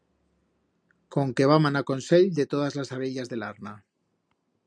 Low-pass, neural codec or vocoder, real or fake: 9.9 kHz; none; real